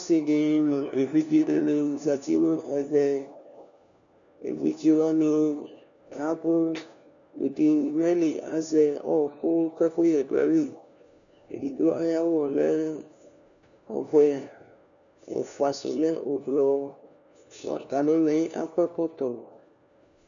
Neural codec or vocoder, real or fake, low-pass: codec, 16 kHz, 1 kbps, FunCodec, trained on LibriTTS, 50 frames a second; fake; 7.2 kHz